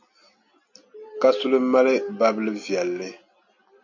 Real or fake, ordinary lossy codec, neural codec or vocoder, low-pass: real; MP3, 48 kbps; none; 7.2 kHz